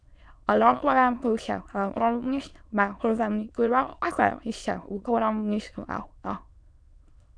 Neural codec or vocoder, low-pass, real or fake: autoencoder, 22.05 kHz, a latent of 192 numbers a frame, VITS, trained on many speakers; 9.9 kHz; fake